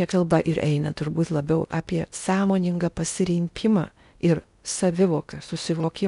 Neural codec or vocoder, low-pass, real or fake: codec, 16 kHz in and 24 kHz out, 0.6 kbps, FocalCodec, streaming, 2048 codes; 10.8 kHz; fake